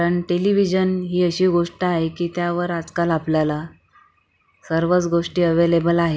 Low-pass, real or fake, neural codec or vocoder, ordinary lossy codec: none; real; none; none